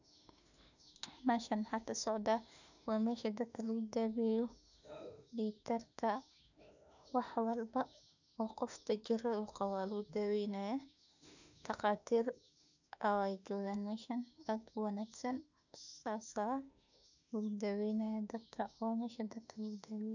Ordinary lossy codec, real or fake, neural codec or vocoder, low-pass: none; fake; autoencoder, 48 kHz, 32 numbers a frame, DAC-VAE, trained on Japanese speech; 7.2 kHz